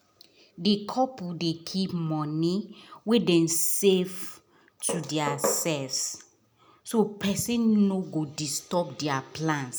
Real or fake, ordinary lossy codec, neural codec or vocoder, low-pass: real; none; none; none